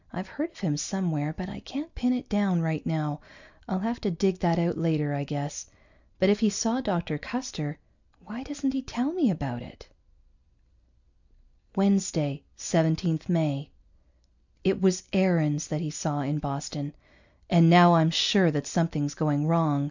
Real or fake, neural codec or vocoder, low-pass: real; none; 7.2 kHz